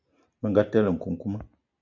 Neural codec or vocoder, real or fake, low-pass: none; real; 7.2 kHz